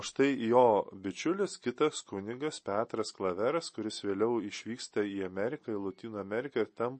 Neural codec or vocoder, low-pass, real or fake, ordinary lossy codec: none; 10.8 kHz; real; MP3, 32 kbps